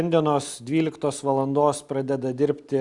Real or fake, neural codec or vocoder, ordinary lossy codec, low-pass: real; none; Opus, 64 kbps; 10.8 kHz